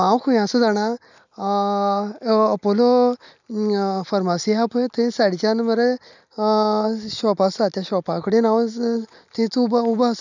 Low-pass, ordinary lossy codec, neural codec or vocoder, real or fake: 7.2 kHz; none; none; real